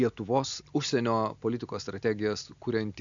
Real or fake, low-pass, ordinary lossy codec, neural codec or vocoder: real; 7.2 kHz; AAC, 64 kbps; none